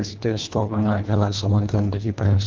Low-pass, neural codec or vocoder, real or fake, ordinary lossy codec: 7.2 kHz; codec, 24 kHz, 1.5 kbps, HILCodec; fake; Opus, 32 kbps